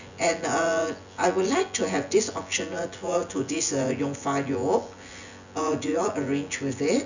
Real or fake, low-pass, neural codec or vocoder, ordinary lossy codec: fake; 7.2 kHz; vocoder, 24 kHz, 100 mel bands, Vocos; none